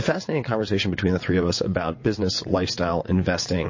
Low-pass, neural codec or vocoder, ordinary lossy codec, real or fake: 7.2 kHz; vocoder, 22.05 kHz, 80 mel bands, WaveNeXt; MP3, 32 kbps; fake